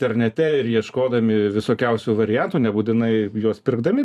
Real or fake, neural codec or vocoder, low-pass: fake; vocoder, 44.1 kHz, 128 mel bands every 512 samples, BigVGAN v2; 14.4 kHz